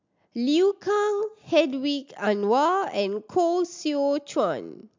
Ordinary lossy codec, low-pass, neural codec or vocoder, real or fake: MP3, 64 kbps; 7.2 kHz; none; real